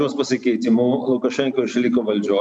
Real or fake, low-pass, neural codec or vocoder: real; 9.9 kHz; none